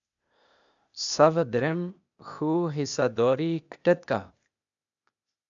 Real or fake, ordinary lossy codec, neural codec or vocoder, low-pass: fake; MP3, 96 kbps; codec, 16 kHz, 0.8 kbps, ZipCodec; 7.2 kHz